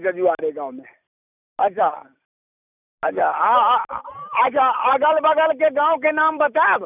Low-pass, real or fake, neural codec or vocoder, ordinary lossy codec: 3.6 kHz; real; none; none